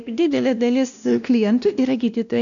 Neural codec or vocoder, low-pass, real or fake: codec, 16 kHz, 1 kbps, X-Codec, WavLM features, trained on Multilingual LibriSpeech; 7.2 kHz; fake